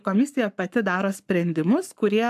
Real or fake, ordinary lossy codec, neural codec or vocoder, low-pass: fake; AAC, 96 kbps; codec, 44.1 kHz, 7.8 kbps, Pupu-Codec; 14.4 kHz